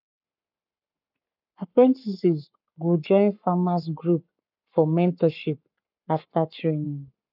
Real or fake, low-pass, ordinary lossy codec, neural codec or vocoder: fake; 5.4 kHz; none; codec, 44.1 kHz, 7.8 kbps, Pupu-Codec